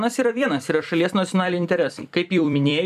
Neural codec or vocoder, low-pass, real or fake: vocoder, 44.1 kHz, 128 mel bands every 256 samples, BigVGAN v2; 14.4 kHz; fake